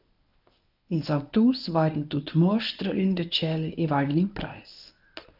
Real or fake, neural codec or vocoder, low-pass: fake; codec, 24 kHz, 0.9 kbps, WavTokenizer, medium speech release version 1; 5.4 kHz